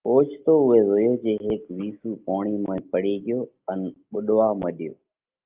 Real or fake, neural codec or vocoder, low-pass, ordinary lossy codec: real; none; 3.6 kHz; Opus, 24 kbps